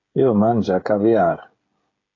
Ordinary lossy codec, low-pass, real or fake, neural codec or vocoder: AAC, 32 kbps; 7.2 kHz; fake; codec, 16 kHz, 16 kbps, FreqCodec, smaller model